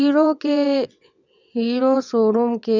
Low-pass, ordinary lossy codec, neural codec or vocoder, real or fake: 7.2 kHz; none; vocoder, 22.05 kHz, 80 mel bands, WaveNeXt; fake